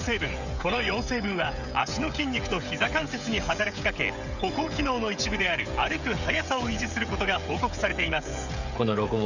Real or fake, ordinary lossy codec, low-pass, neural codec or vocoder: fake; none; 7.2 kHz; codec, 16 kHz, 16 kbps, FreqCodec, smaller model